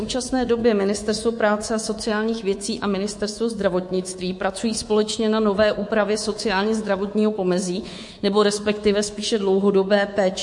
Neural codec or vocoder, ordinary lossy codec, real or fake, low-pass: codec, 24 kHz, 3.1 kbps, DualCodec; MP3, 48 kbps; fake; 10.8 kHz